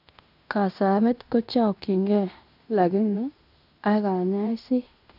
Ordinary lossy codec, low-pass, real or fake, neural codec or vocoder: none; 5.4 kHz; fake; codec, 16 kHz in and 24 kHz out, 0.9 kbps, LongCat-Audio-Codec, fine tuned four codebook decoder